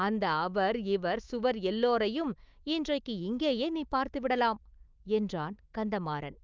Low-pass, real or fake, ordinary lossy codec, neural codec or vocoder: 7.2 kHz; fake; Opus, 24 kbps; autoencoder, 48 kHz, 128 numbers a frame, DAC-VAE, trained on Japanese speech